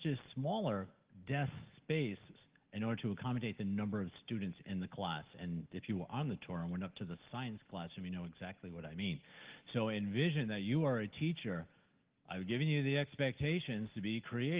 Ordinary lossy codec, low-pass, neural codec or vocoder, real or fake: Opus, 16 kbps; 3.6 kHz; none; real